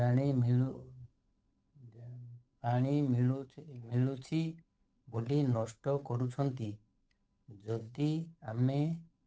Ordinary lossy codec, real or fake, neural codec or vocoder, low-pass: none; fake; codec, 16 kHz, 8 kbps, FunCodec, trained on Chinese and English, 25 frames a second; none